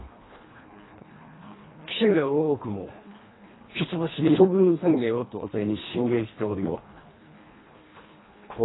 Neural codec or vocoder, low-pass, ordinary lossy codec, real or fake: codec, 24 kHz, 1.5 kbps, HILCodec; 7.2 kHz; AAC, 16 kbps; fake